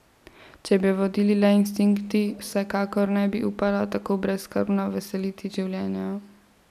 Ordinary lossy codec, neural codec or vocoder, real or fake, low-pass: none; none; real; 14.4 kHz